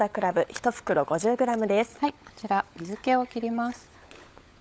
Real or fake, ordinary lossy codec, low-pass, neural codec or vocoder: fake; none; none; codec, 16 kHz, 16 kbps, FunCodec, trained on LibriTTS, 50 frames a second